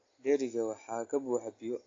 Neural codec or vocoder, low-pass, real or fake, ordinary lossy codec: none; 7.2 kHz; real; AAC, 32 kbps